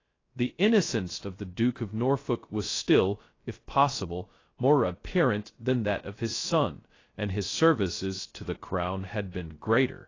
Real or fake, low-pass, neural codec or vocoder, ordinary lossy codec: fake; 7.2 kHz; codec, 16 kHz, 0.2 kbps, FocalCodec; AAC, 32 kbps